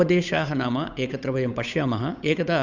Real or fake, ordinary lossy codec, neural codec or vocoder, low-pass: fake; Opus, 64 kbps; codec, 16 kHz, 8 kbps, FunCodec, trained on Chinese and English, 25 frames a second; 7.2 kHz